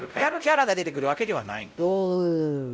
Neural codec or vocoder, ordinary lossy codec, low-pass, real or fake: codec, 16 kHz, 0.5 kbps, X-Codec, WavLM features, trained on Multilingual LibriSpeech; none; none; fake